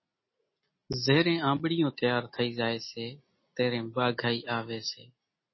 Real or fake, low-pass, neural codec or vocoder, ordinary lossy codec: real; 7.2 kHz; none; MP3, 24 kbps